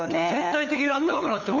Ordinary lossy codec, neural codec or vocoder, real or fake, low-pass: none; codec, 16 kHz, 8 kbps, FunCodec, trained on LibriTTS, 25 frames a second; fake; 7.2 kHz